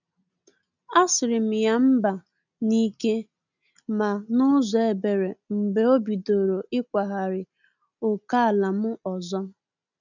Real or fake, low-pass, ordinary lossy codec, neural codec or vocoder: real; 7.2 kHz; none; none